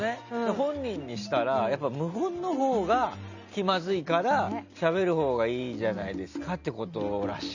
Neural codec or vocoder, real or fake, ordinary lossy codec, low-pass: none; real; Opus, 64 kbps; 7.2 kHz